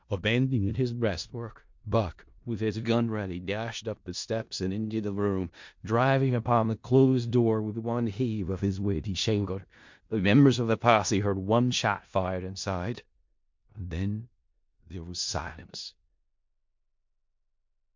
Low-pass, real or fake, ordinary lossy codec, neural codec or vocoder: 7.2 kHz; fake; MP3, 48 kbps; codec, 16 kHz in and 24 kHz out, 0.4 kbps, LongCat-Audio-Codec, four codebook decoder